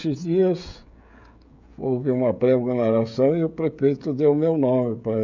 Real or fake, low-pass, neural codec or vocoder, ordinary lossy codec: fake; 7.2 kHz; codec, 16 kHz, 16 kbps, FreqCodec, smaller model; none